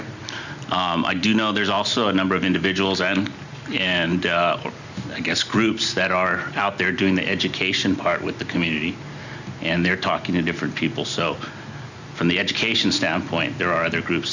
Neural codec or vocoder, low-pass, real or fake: none; 7.2 kHz; real